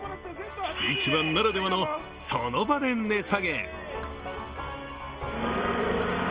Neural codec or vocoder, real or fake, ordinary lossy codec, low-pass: none; real; Opus, 24 kbps; 3.6 kHz